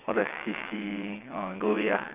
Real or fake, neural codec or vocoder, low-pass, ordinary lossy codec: fake; vocoder, 22.05 kHz, 80 mel bands, WaveNeXt; 3.6 kHz; none